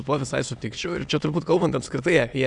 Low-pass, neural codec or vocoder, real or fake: 9.9 kHz; autoencoder, 22.05 kHz, a latent of 192 numbers a frame, VITS, trained on many speakers; fake